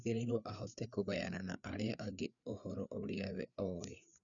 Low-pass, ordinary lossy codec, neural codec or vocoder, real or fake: 7.2 kHz; none; codec, 16 kHz, 4 kbps, FreqCodec, larger model; fake